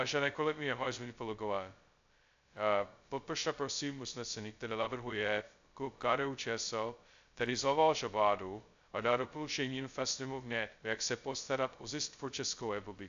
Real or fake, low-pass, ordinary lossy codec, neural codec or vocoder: fake; 7.2 kHz; AAC, 64 kbps; codec, 16 kHz, 0.2 kbps, FocalCodec